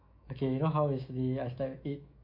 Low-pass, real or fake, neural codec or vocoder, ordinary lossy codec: 5.4 kHz; fake; autoencoder, 48 kHz, 128 numbers a frame, DAC-VAE, trained on Japanese speech; AAC, 32 kbps